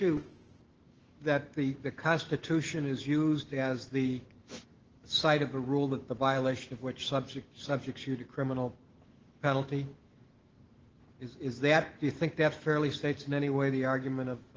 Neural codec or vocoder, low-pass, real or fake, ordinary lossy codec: autoencoder, 48 kHz, 128 numbers a frame, DAC-VAE, trained on Japanese speech; 7.2 kHz; fake; Opus, 16 kbps